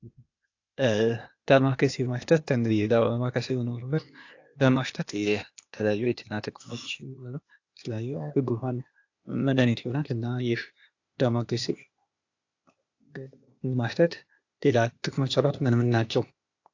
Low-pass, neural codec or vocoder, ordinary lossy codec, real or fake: 7.2 kHz; codec, 16 kHz, 0.8 kbps, ZipCodec; AAC, 48 kbps; fake